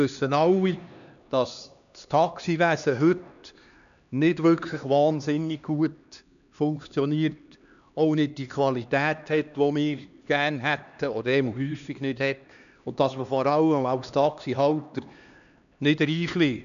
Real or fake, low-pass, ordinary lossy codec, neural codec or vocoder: fake; 7.2 kHz; none; codec, 16 kHz, 2 kbps, X-Codec, HuBERT features, trained on LibriSpeech